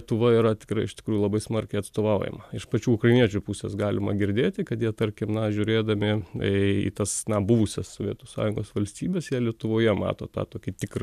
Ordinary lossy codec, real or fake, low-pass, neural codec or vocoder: MP3, 96 kbps; real; 14.4 kHz; none